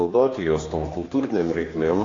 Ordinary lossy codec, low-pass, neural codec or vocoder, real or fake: AAC, 32 kbps; 7.2 kHz; codec, 16 kHz, 2 kbps, X-Codec, HuBERT features, trained on general audio; fake